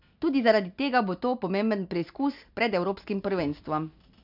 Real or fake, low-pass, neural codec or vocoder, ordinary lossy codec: real; 5.4 kHz; none; none